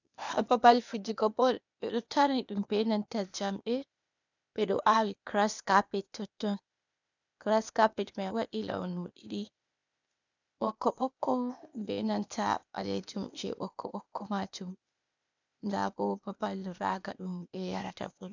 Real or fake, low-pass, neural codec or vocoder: fake; 7.2 kHz; codec, 16 kHz, 0.8 kbps, ZipCodec